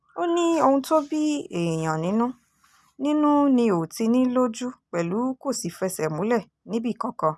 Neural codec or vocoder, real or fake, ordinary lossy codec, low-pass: none; real; none; none